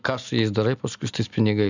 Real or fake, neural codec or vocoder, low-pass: real; none; 7.2 kHz